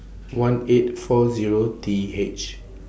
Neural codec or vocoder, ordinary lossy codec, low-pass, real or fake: none; none; none; real